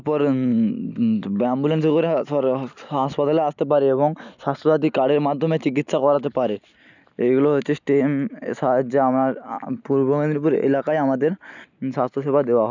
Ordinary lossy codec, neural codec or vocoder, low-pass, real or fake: none; none; 7.2 kHz; real